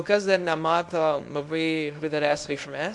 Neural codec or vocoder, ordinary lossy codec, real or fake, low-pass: codec, 24 kHz, 0.9 kbps, WavTokenizer, small release; AAC, 64 kbps; fake; 10.8 kHz